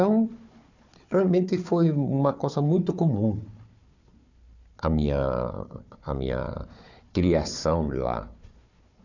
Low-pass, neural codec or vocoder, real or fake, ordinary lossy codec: 7.2 kHz; codec, 16 kHz, 4 kbps, FunCodec, trained on Chinese and English, 50 frames a second; fake; none